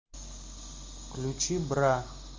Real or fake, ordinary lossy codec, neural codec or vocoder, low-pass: real; Opus, 32 kbps; none; 7.2 kHz